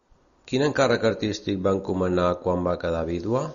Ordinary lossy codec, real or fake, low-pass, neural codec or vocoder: MP3, 64 kbps; real; 7.2 kHz; none